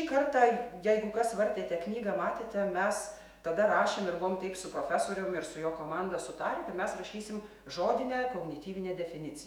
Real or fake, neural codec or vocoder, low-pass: fake; autoencoder, 48 kHz, 128 numbers a frame, DAC-VAE, trained on Japanese speech; 19.8 kHz